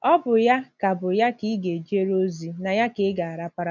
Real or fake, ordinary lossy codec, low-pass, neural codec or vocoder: real; none; 7.2 kHz; none